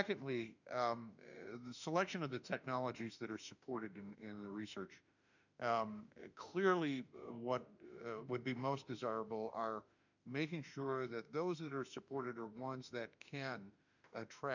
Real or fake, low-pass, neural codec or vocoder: fake; 7.2 kHz; autoencoder, 48 kHz, 32 numbers a frame, DAC-VAE, trained on Japanese speech